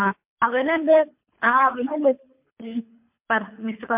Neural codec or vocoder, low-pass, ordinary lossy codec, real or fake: codec, 24 kHz, 3 kbps, HILCodec; 3.6 kHz; MP3, 32 kbps; fake